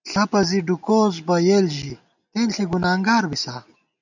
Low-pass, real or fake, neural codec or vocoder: 7.2 kHz; real; none